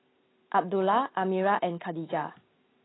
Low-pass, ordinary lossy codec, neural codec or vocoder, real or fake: 7.2 kHz; AAC, 16 kbps; none; real